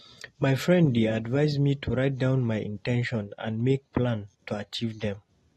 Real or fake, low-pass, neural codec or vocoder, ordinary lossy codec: real; 19.8 kHz; none; AAC, 32 kbps